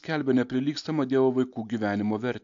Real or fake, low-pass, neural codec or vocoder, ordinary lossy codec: real; 7.2 kHz; none; AAC, 64 kbps